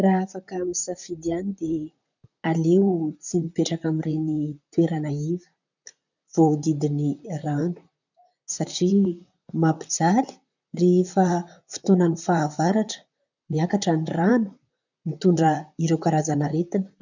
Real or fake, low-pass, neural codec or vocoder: fake; 7.2 kHz; vocoder, 44.1 kHz, 128 mel bands, Pupu-Vocoder